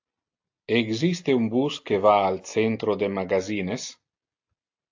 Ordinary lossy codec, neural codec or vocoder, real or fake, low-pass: AAC, 48 kbps; none; real; 7.2 kHz